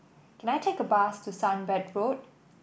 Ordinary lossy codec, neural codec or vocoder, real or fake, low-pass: none; none; real; none